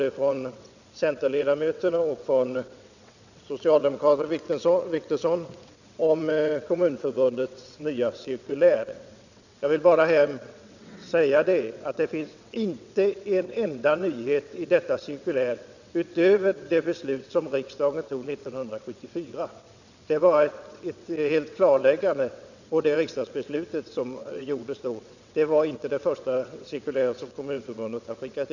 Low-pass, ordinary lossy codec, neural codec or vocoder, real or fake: 7.2 kHz; none; vocoder, 22.05 kHz, 80 mel bands, WaveNeXt; fake